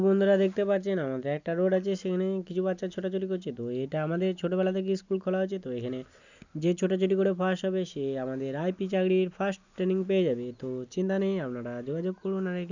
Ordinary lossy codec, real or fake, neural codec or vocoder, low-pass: none; real; none; 7.2 kHz